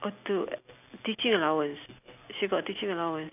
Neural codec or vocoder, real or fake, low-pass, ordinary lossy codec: none; real; 3.6 kHz; none